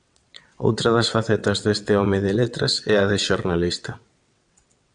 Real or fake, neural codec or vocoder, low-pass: fake; vocoder, 22.05 kHz, 80 mel bands, WaveNeXt; 9.9 kHz